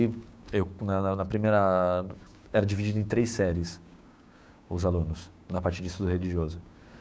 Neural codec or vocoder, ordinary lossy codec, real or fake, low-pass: codec, 16 kHz, 6 kbps, DAC; none; fake; none